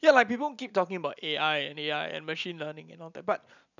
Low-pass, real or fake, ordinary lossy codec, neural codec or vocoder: 7.2 kHz; real; none; none